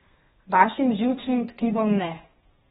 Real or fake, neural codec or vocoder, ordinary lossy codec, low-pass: fake; codec, 32 kHz, 1.9 kbps, SNAC; AAC, 16 kbps; 14.4 kHz